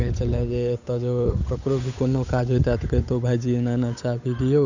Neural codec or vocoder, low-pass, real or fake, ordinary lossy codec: codec, 16 kHz, 8 kbps, FunCodec, trained on Chinese and English, 25 frames a second; 7.2 kHz; fake; none